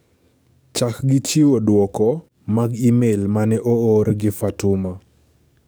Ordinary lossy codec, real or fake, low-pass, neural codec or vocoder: none; fake; none; codec, 44.1 kHz, 7.8 kbps, DAC